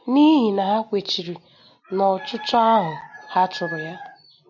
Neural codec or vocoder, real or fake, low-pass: none; real; 7.2 kHz